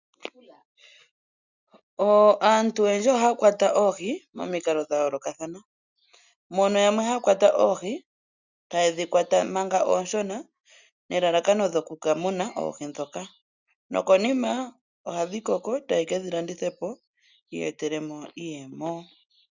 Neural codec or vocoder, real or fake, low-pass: none; real; 7.2 kHz